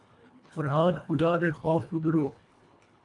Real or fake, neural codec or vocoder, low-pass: fake; codec, 24 kHz, 1.5 kbps, HILCodec; 10.8 kHz